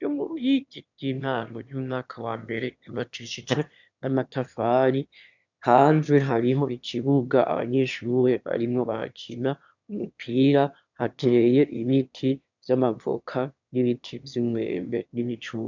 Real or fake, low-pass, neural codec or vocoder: fake; 7.2 kHz; autoencoder, 22.05 kHz, a latent of 192 numbers a frame, VITS, trained on one speaker